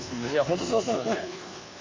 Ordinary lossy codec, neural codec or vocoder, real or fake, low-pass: AAC, 32 kbps; codec, 24 kHz, 1.2 kbps, DualCodec; fake; 7.2 kHz